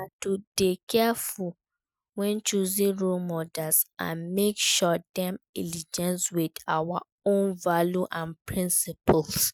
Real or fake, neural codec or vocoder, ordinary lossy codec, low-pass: real; none; none; none